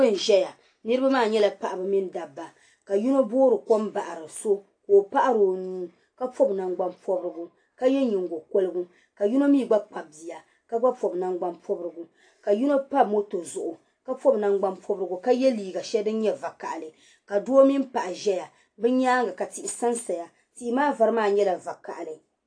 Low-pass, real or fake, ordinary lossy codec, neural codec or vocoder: 9.9 kHz; real; AAC, 48 kbps; none